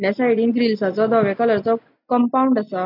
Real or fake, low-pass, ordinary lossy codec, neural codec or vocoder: real; 5.4 kHz; none; none